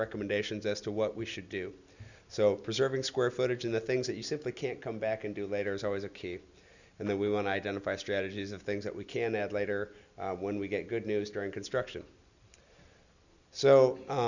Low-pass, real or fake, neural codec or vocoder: 7.2 kHz; real; none